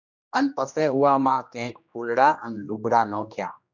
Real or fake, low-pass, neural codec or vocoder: fake; 7.2 kHz; codec, 16 kHz, 1 kbps, X-Codec, HuBERT features, trained on general audio